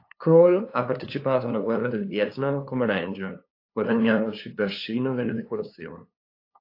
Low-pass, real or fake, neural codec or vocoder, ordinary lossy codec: 5.4 kHz; fake; codec, 16 kHz, 2 kbps, FunCodec, trained on LibriTTS, 25 frames a second; AAC, 32 kbps